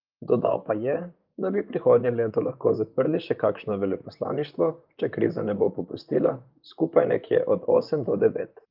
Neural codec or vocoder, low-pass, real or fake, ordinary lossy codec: vocoder, 44.1 kHz, 128 mel bands, Pupu-Vocoder; 5.4 kHz; fake; Opus, 24 kbps